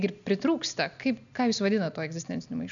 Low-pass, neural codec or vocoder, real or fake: 7.2 kHz; none; real